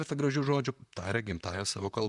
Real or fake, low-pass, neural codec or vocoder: fake; 10.8 kHz; vocoder, 44.1 kHz, 128 mel bands, Pupu-Vocoder